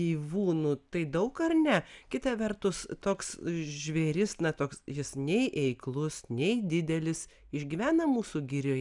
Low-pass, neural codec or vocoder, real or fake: 10.8 kHz; none; real